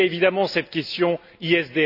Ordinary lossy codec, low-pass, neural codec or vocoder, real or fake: none; 5.4 kHz; none; real